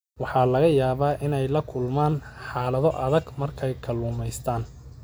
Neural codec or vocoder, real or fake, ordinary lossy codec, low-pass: none; real; none; none